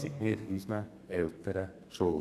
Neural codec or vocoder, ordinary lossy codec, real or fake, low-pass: codec, 32 kHz, 1.9 kbps, SNAC; none; fake; 14.4 kHz